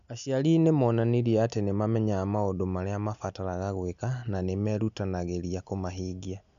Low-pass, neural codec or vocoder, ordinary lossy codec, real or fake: 7.2 kHz; none; none; real